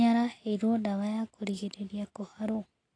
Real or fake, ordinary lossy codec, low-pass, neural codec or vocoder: real; AAC, 32 kbps; 9.9 kHz; none